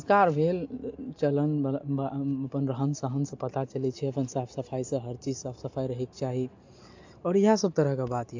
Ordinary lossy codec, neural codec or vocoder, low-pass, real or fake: AAC, 48 kbps; none; 7.2 kHz; real